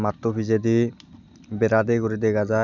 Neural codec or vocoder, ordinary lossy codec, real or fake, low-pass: none; none; real; 7.2 kHz